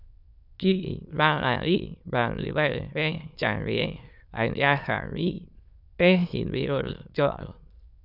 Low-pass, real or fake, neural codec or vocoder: 5.4 kHz; fake; autoencoder, 22.05 kHz, a latent of 192 numbers a frame, VITS, trained on many speakers